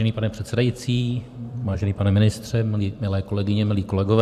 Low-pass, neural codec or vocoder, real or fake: 14.4 kHz; vocoder, 44.1 kHz, 128 mel bands every 256 samples, BigVGAN v2; fake